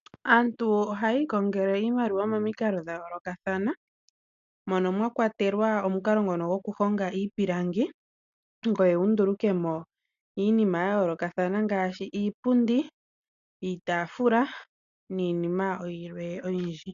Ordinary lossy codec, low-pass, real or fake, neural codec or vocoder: AAC, 96 kbps; 7.2 kHz; real; none